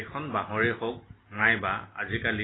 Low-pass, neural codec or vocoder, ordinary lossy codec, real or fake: 7.2 kHz; none; AAC, 16 kbps; real